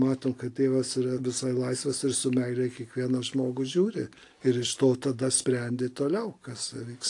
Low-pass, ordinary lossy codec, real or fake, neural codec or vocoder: 10.8 kHz; AAC, 48 kbps; real; none